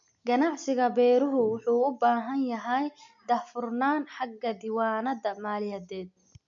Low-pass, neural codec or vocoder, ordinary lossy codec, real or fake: 7.2 kHz; none; none; real